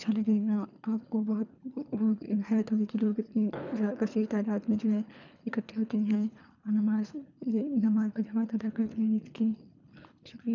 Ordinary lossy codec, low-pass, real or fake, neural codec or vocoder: none; 7.2 kHz; fake; codec, 24 kHz, 3 kbps, HILCodec